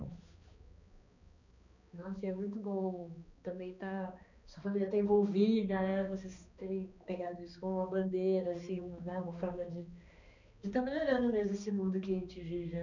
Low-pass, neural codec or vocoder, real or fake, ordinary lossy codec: 7.2 kHz; codec, 16 kHz, 2 kbps, X-Codec, HuBERT features, trained on balanced general audio; fake; none